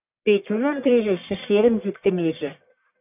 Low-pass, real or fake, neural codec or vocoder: 3.6 kHz; fake; codec, 44.1 kHz, 1.7 kbps, Pupu-Codec